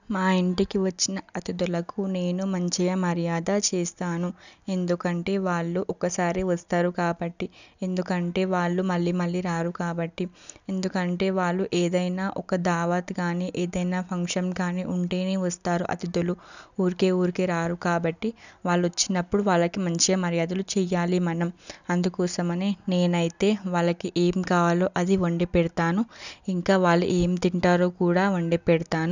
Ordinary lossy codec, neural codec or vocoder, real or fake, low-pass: none; none; real; 7.2 kHz